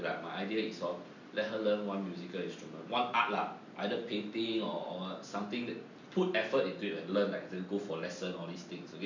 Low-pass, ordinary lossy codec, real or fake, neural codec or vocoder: 7.2 kHz; MP3, 48 kbps; real; none